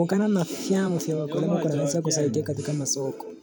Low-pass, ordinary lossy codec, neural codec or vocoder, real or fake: none; none; vocoder, 44.1 kHz, 128 mel bands every 512 samples, BigVGAN v2; fake